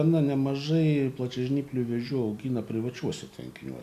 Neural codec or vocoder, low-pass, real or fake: none; 14.4 kHz; real